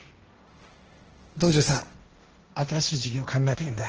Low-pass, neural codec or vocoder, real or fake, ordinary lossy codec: 7.2 kHz; codec, 16 kHz, 1.1 kbps, Voila-Tokenizer; fake; Opus, 16 kbps